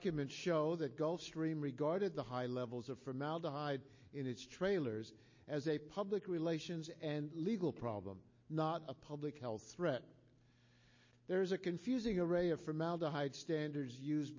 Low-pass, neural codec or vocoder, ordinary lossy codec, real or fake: 7.2 kHz; none; MP3, 32 kbps; real